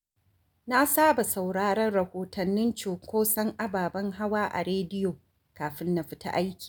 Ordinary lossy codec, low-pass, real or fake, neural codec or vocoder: none; none; real; none